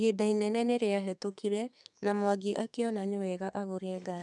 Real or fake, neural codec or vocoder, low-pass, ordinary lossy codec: fake; codec, 32 kHz, 1.9 kbps, SNAC; 10.8 kHz; none